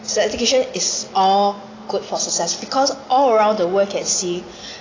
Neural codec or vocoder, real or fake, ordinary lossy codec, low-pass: none; real; AAC, 32 kbps; 7.2 kHz